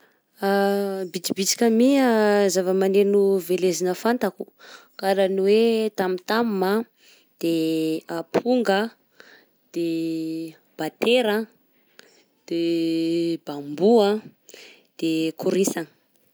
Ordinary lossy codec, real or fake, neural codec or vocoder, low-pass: none; real; none; none